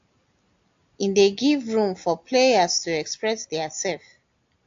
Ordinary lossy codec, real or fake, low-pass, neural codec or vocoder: AAC, 48 kbps; real; 7.2 kHz; none